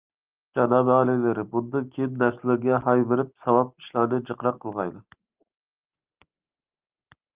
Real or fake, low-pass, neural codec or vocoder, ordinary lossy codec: real; 3.6 kHz; none; Opus, 32 kbps